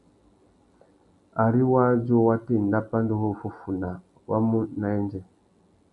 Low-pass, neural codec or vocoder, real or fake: 10.8 kHz; none; real